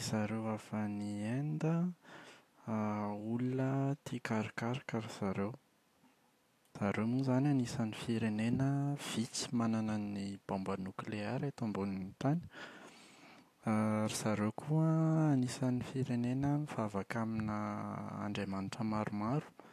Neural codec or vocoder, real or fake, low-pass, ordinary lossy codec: none; real; 14.4 kHz; AAC, 64 kbps